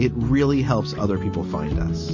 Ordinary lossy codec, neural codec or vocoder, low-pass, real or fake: MP3, 32 kbps; none; 7.2 kHz; real